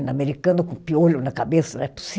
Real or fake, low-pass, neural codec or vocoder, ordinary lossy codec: real; none; none; none